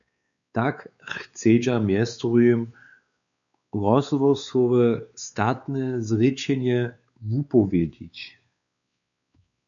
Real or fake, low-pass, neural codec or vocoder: fake; 7.2 kHz; codec, 16 kHz, 4 kbps, X-Codec, WavLM features, trained on Multilingual LibriSpeech